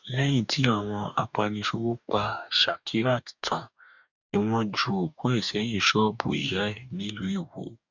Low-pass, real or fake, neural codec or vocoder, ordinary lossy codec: 7.2 kHz; fake; codec, 44.1 kHz, 2.6 kbps, DAC; none